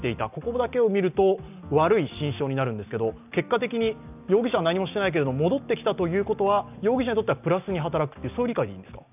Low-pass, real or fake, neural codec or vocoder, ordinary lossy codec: 3.6 kHz; real; none; none